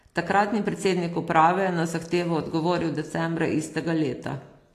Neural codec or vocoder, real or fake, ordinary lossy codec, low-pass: none; real; AAC, 48 kbps; 14.4 kHz